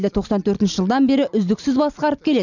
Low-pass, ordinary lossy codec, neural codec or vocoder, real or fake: 7.2 kHz; none; none; real